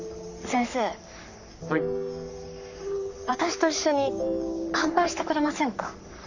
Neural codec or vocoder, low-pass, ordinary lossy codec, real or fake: codec, 44.1 kHz, 3.4 kbps, Pupu-Codec; 7.2 kHz; none; fake